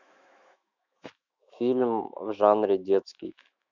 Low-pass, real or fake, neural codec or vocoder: 7.2 kHz; fake; autoencoder, 48 kHz, 128 numbers a frame, DAC-VAE, trained on Japanese speech